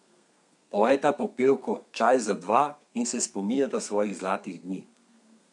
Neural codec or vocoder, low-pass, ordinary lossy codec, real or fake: codec, 32 kHz, 1.9 kbps, SNAC; 10.8 kHz; none; fake